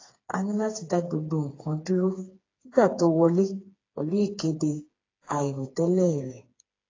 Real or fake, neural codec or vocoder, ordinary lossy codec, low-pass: fake; codec, 16 kHz, 4 kbps, FreqCodec, smaller model; AAC, 32 kbps; 7.2 kHz